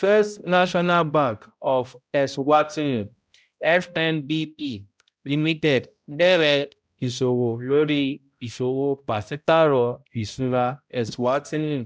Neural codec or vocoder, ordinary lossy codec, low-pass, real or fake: codec, 16 kHz, 0.5 kbps, X-Codec, HuBERT features, trained on balanced general audio; none; none; fake